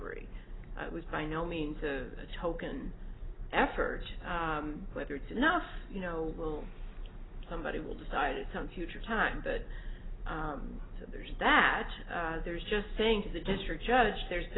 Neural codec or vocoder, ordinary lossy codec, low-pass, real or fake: none; AAC, 16 kbps; 7.2 kHz; real